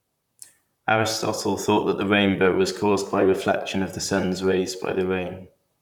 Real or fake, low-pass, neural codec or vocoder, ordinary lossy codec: fake; 19.8 kHz; vocoder, 44.1 kHz, 128 mel bands, Pupu-Vocoder; none